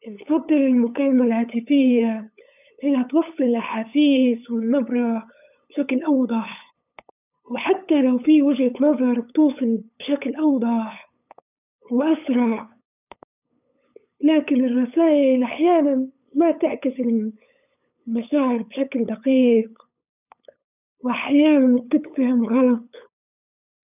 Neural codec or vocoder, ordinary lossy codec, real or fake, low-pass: codec, 16 kHz, 8 kbps, FunCodec, trained on LibriTTS, 25 frames a second; none; fake; 3.6 kHz